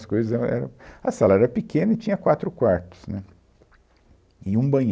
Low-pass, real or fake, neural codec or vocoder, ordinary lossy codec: none; real; none; none